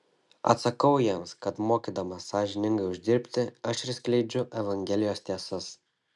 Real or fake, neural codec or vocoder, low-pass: real; none; 10.8 kHz